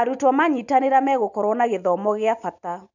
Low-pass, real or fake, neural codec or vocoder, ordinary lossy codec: 7.2 kHz; real; none; none